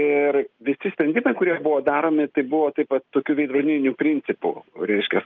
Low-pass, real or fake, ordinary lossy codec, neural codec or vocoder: 7.2 kHz; real; Opus, 24 kbps; none